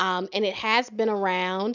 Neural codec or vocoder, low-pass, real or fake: none; 7.2 kHz; real